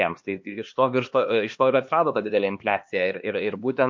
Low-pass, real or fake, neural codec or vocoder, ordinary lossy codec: 7.2 kHz; fake; codec, 16 kHz, 2 kbps, X-Codec, HuBERT features, trained on LibriSpeech; MP3, 48 kbps